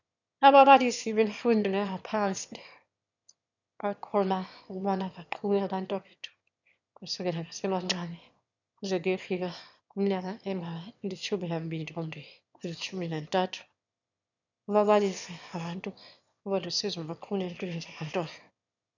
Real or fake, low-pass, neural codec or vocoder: fake; 7.2 kHz; autoencoder, 22.05 kHz, a latent of 192 numbers a frame, VITS, trained on one speaker